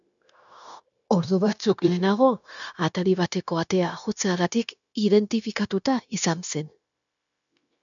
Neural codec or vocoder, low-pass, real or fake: codec, 16 kHz, 0.9 kbps, LongCat-Audio-Codec; 7.2 kHz; fake